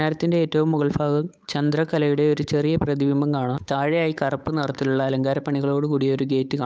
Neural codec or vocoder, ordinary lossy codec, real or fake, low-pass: codec, 16 kHz, 8 kbps, FunCodec, trained on Chinese and English, 25 frames a second; none; fake; none